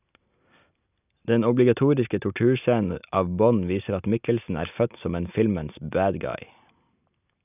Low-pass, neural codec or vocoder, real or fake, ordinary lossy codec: 3.6 kHz; none; real; none